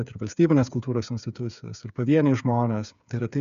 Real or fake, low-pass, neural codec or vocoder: fake; 7.2 kHz; codec, 16 kHz, 8 kbps, FreqCodec, smaller model